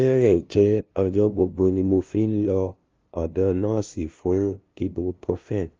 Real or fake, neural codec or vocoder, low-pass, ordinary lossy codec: fake; codec, 16 kHz, 0.5 kbps, FunCodec, trained on LibriTTS, 25 frames a second; 7.2 kHz; Opus, 32 kbps